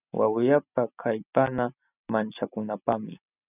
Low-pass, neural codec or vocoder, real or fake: 3.6 kHz; none; real